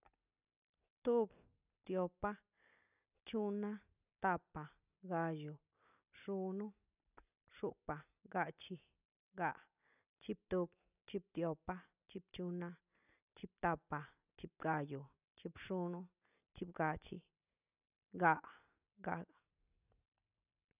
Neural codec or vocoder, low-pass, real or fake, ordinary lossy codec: none; 3.6 kHz; real; none